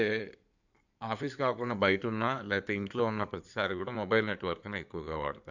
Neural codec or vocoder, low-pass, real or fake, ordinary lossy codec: codec, 16 kHz in and 24 kHz out, 2.2 kbps, FireRedTTS-2 codec; 7.2 kHz; fake; none